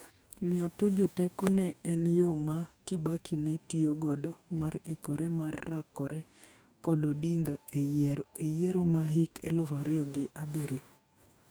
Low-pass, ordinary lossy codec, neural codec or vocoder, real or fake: none; none; codec, 44.1 kHz, 2.6 kbps, DAC; fake